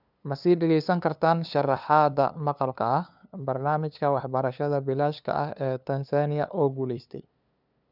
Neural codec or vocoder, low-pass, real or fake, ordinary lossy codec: codec, 16 kHz, 2 kbps, FunCodec, trained on LibriTTS, 25 frames a second; 5.4 kHz; fake; none